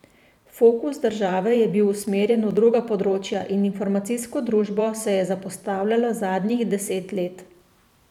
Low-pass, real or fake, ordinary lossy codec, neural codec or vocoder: 19.8 kHz; fake; none; vocoder, 44.1 kHz, 128 mel bands every 512 samples, BigVGAN v2